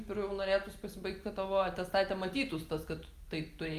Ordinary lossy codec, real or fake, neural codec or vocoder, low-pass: Opus, 32 kbps; real; none; 14.4 kHz